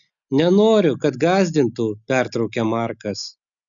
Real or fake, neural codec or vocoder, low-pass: real; none; 7.2 kHz